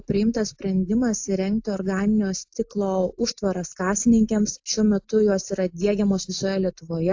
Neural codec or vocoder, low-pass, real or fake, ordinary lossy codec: none; 7.2 kHz; real; AAC, 48 kbps